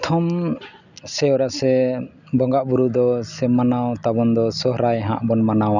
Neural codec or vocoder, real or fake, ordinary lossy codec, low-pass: none; real; none; 7.2 kHz